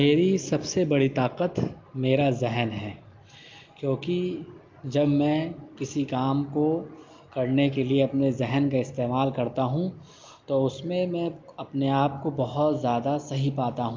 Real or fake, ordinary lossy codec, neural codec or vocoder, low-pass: real; Opus, 24 kbps; none; 7.2 kHz